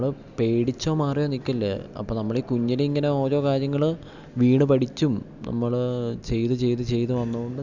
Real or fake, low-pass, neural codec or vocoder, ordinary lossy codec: real; 7.2 kHz; none; none